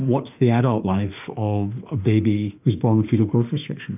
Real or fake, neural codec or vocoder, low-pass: fake; autoencoder, 48 kHz, 32 numbers a frame, DAC-VAE, trained on Japanese speech; 3.6 kHz